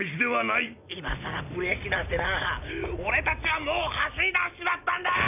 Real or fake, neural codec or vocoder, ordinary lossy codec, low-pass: real; none; none; 3.6 kHz